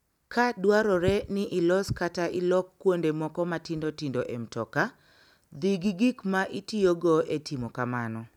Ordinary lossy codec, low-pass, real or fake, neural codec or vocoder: none; 19.8 kHz; real; none